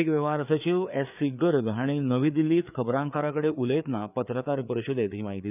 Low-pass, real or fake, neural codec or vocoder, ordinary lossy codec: 3.6 kHz; fake; codec, 16 kHz, 4 kbps, FreqCodec, larger model; none